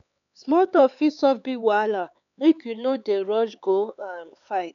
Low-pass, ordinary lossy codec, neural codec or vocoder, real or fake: 7.2 kHz; none; codec, 16 kHz, 4 kbps, X-Codec, HuBERT features, trained on LibriSpeech; fake